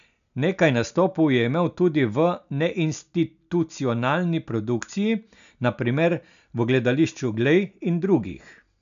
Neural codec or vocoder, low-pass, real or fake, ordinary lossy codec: none; 7.2 kHz; real; none